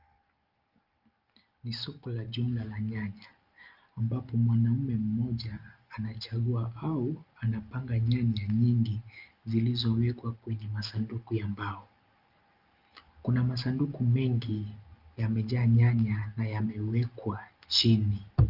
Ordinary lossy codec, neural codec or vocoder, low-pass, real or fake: Opus, 24 kbps; none; 5.4 kHz; real